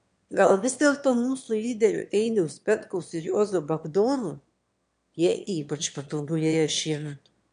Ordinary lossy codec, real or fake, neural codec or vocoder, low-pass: MP3, 64 kbps; fake; autoencoder, 22.05 kHz, a latent of 192 numbers a frame, VITS, trained on one speaker; 9.9 kHz